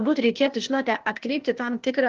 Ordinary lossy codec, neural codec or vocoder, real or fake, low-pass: Opus, 16 kbps; codec, 16 kHz, 0.8 kbps, ZipCodec; fake; 7.2 kHz